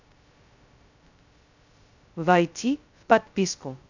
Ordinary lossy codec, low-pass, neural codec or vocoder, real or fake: none; 7.2 kHz; codec, 16 kHz, 0.2 kbps, FocalCodec; fake